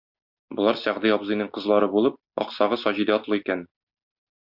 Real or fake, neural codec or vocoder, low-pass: real; none; 5.4 kHz